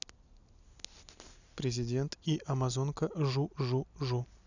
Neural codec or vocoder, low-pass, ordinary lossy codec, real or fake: none; 7.2 kHz; none; real